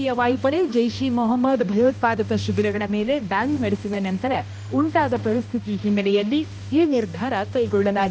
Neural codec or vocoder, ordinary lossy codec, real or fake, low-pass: codec, 16 kHz, 1 kbps, X-Codec, HuBERT features, trained on balanced general audio; none; fake; none